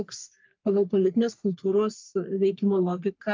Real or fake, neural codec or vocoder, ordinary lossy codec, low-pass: fake; codec, 44.1 kHz, 3.4 kbps, Pupu-Codec; Opus, 24 kbps; 7.2 kHz